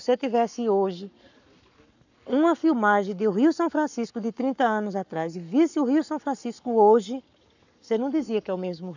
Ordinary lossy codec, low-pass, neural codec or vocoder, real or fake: none; 7.2 kHz; codec, 44.1 kHz, 7.8 kbps, Pupu-Codec; fake